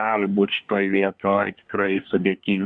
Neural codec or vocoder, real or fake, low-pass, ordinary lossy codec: codec, 24 kHz, 1 kbps, SNAC; fake; 9.9 kHz; Opus, 64 kbps